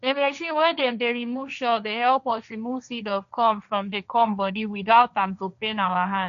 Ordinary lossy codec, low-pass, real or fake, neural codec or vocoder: none; 7.2 kHz; fake; codec, 16 kHz, 1.1 kbps, Voila-Tokenizer